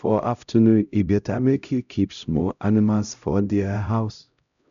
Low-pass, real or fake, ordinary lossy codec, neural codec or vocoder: 7.2 kHz; fake; none; codec, 16 kHz, 0.5 kbps, X-Codec, HuBERT features, trained on LibriSpeech